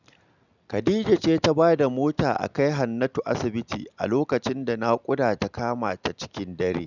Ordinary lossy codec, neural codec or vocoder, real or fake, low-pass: none; none; real; 7.2 kHz